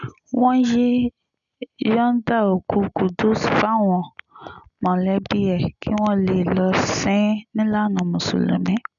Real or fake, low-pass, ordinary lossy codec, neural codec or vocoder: real; 7.2 kHz; none; none